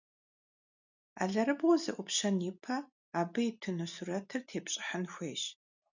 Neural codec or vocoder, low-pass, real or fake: none; 7.2 kHz; real